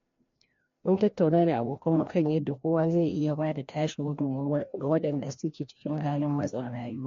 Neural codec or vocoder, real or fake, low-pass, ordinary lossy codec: codec, 16 kHz, 1 kbps, FreqCodec, larger model; fake; 7.2 kHz; MP3, 48 kbps